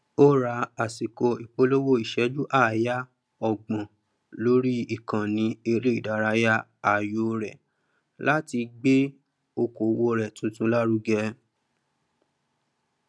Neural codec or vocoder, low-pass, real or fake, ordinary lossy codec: none; none; real; none